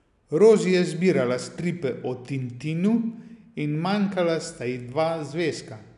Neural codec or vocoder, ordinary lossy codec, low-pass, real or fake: none; none; 10.8 kHz; real